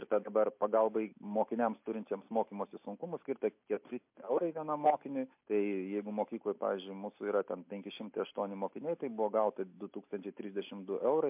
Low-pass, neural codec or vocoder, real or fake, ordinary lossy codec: 3.6 kHz; none; real; MP3, 32 kbps